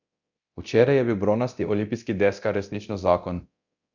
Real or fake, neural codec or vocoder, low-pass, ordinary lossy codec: fake; codec, 24 kHz, 0.9 kbps, DualCodec; 7.2 kHz; none